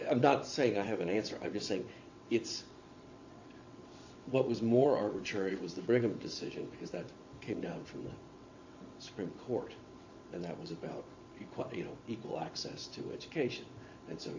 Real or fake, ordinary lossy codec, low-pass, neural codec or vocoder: fake; AAC, 48 kbps; 7.2 kHz; vocoder, 22.05 kHz, 80 mel bands, WaveNeXt